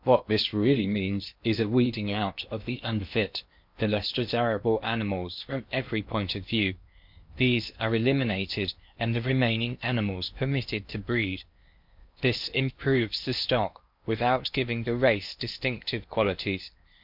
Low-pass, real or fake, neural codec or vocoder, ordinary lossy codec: 5.4 kHz; fake; codec, 16 kHz in and 24 kHz out, 0.8 kbps, FocalCodec, streaming, 65536 codes; MP3, 48 kbps